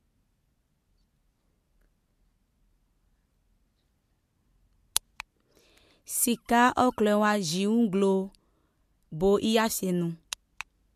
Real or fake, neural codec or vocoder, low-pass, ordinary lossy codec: real; none; 14.4 kHz; MP3, 64 kbps